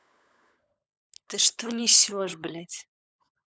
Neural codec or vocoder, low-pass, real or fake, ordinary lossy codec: codec, 16 kHz, 16 kbps, FunCodec, trained on LibriTTS, 50 frames a second; none; fake; none